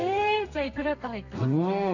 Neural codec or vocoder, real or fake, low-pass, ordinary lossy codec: codec, 32 kHz, 1.9 kbps, SNAC; fake; 7.2 kHz; none